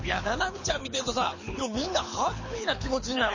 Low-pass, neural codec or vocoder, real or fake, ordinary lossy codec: 7.2 kHz; codec, 24 kHz, 6 kbps, HILCodec; fake; MP3, 32 kbps